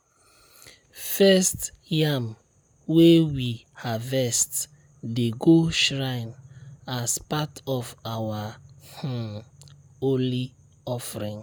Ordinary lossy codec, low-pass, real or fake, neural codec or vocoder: none; none; real; none